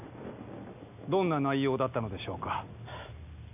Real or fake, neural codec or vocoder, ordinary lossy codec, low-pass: real; none; none; 3.6 kHz